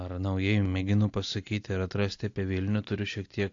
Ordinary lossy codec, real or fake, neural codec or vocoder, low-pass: AAC, 48 kbps; real; none; 7.2 kHz